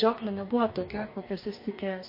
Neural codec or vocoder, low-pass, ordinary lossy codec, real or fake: codec, 44.1 kHz, 2.6 kbps, DAC; 5.4 kHz; MP3, 32 kbps; fake